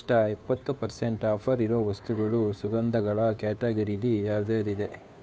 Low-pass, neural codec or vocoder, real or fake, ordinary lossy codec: none; codec, 16 kHz, 2 kbps, FunCodec, trained on Chinese and English, 25 frames a second; fake; none